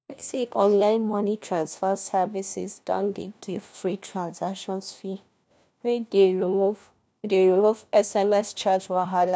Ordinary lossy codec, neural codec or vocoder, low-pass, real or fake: none; codec, 16 kHz, 1 kbps, FunCodec, trained on LibriTTS, 50 frames a second; none; fake